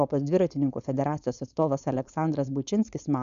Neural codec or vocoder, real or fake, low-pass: codec, 16 kHz, 4.8 kbps, FACodec; fake; 7.2 kHz